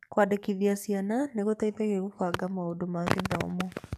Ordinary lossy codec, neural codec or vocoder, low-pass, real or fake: none; codec, 44.1 kHz, 7.8 kbps, DAC; 14.4 kHz; fake